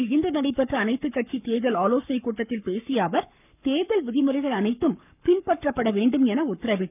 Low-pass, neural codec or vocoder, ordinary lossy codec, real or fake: 3.6 kHz; codec, 44.1 kHz, 7.8 kbps, Pupu-Codec; none; fake